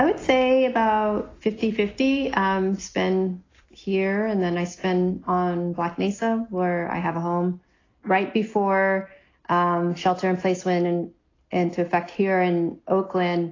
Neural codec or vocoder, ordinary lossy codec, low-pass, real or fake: none; AAC, 32 kbps; 7.2 kHz; real